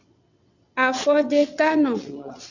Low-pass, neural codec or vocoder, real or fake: 7.2 kHz; vocoder, 22.05 kHz, 80 mel bands, WaveNeXt; fake